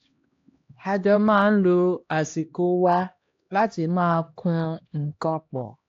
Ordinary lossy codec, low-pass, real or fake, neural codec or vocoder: AAC, 48 kbps; 7.2 kHz; fake; codec, 16 kHz, 1 kbps, X-Codec, HuBERT features, trained on LibriSpeech